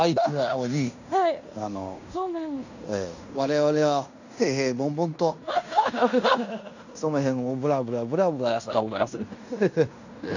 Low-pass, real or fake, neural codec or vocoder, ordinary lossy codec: 7.2 kHz; fake; codec, 16 kHz in and 24 kHz out, 0.9 kbps, LongCat-Audio-Codec, fine tuned four codebook decoder; none